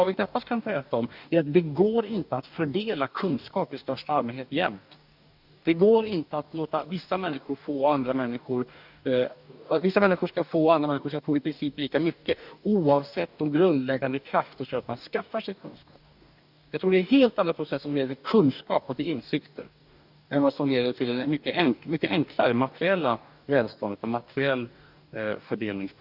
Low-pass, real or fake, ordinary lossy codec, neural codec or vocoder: 5.4 kHz; fake; none; codec, 44.1 kHz, 2.6 kbps, DAC